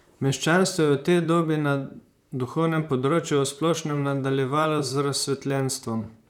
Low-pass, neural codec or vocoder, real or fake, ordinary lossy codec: 19.8 kHz; vocoder, 44.1 kHz, 128 mel bands, Pupu-Vocoder; fake; none